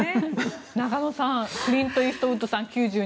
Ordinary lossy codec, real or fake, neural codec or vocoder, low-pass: none; real; none; none